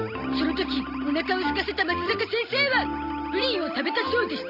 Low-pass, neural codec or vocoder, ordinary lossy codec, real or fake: 5.4 kHz; none; none; real